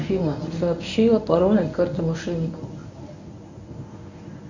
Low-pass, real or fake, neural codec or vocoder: 7.2 kHz; fake; codec, 24 kHz, 0.9 kbps, WavTokenizer, medium speech release version 1